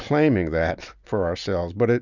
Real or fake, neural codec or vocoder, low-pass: real; none; 7.2 kHz